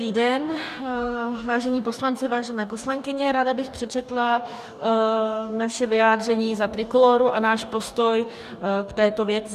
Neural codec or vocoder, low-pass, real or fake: codec, 44.1 kHz, 2.6 kbps, DAC; 14.4 kHz; fake